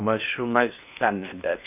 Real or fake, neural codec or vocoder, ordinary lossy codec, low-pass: fake; codec, 16 kHz in and 24 kHz out, 0.8 kbps, FocalCodec, streaming, 65536 codes; none; 3.6 kHz